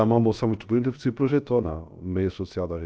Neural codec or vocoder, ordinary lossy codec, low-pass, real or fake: codec, 16 kHz, about 1 kbps, DyCAST, with the encoder's durations; none; none; fake